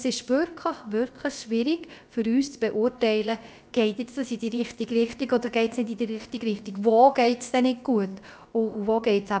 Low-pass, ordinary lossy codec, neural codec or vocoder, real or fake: none; none; codec, 16 kHz, about 1 kbps, DyCAST, with the encoder's durations; fake